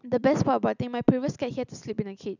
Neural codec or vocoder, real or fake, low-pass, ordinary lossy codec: none; real; 7.2 kHz; none